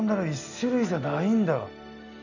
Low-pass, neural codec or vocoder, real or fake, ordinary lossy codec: 7.2 kHz; none; real; none